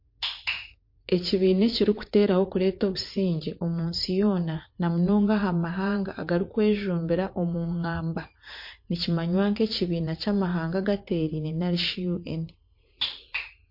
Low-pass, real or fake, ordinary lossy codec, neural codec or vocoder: 5.4 kHz; fake; MP3, 32 kbps; vocoder, 44.1 kHz, 80 mel bands, Vocos